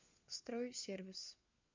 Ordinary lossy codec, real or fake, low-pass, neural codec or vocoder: MP3, 64 kbps; fake; 7.2 kHz; codec, 16 kHz, 4 kbps, FunCodec, trained on LibriTTS, 50 frames a second